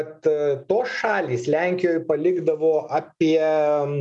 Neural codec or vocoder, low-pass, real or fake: none; 10.8 kHz; real